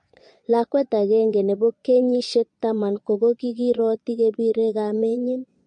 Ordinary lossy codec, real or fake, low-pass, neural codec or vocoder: MP3, 48 kbps; fake; 9.9 kHz; vocoder, 22.05 kHz, 80 mel bands, Vocos